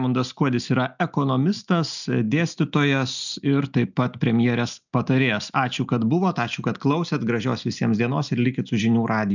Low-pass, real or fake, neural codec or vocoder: 7.2 kHz; real; none